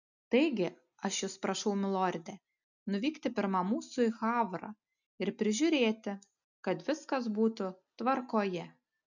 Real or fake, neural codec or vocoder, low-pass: real; none; 7.2 kHz